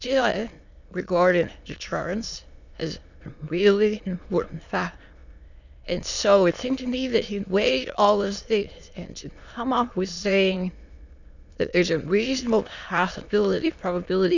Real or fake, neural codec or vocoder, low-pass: fake; autoencoder, 22.05 kHz, a latent of 192 numbers a frame, VITS, trained on many speakers; 7.2 kHz